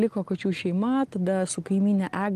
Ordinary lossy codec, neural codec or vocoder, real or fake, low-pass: Opus, 24 kbps; none; real; 14.4 kHz